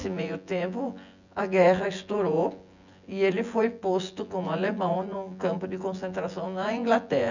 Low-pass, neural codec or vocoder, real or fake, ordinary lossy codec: 7.2 kHz; vocoder, 24 kHz, 100 mel bands, Vocos; fake; none